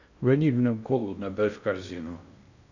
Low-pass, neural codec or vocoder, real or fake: 7.2 kHz; codec, 16 kHz in and 24 kHz out, 0.6 kbps, FocalCodec, streaming, 2048 codes; fake